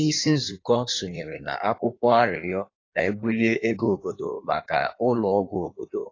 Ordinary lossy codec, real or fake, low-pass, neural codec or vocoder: AAC, 32 kbps; fake; 7.2 kHz; codec, 16 kHz in and 24 kHz out, 1.1 kbps, FireRedTTS-2 codec